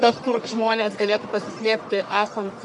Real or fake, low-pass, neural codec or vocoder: fake; 10.8 kHz; codec, 44.1 kHz, 1.7 kbps, Pupu-Codec